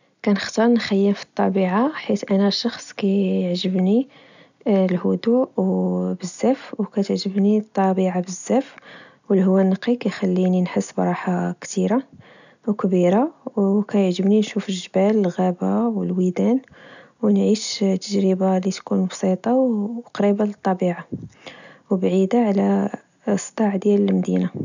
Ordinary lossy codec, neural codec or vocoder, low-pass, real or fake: none; none; 7.2 kHz; real